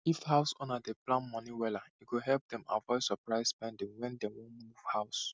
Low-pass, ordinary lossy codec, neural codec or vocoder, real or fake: none; none; none; real